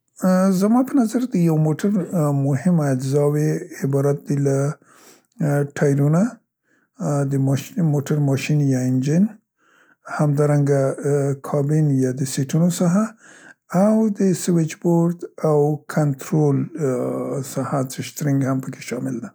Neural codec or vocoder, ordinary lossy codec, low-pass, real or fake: none; none; none; real